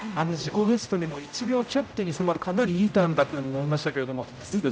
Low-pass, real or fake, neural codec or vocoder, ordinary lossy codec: none; fake; codec, 16 kHz, 0.5 kbps, X-Codec, HuBERT features, trained on general audio; none